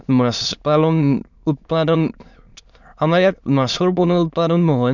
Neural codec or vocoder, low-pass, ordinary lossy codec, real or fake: autoencoder, 22.05 kHz, a latent of 192 numbers a frame, VITS, trained on many speakers; 7.2 kHz; none; fake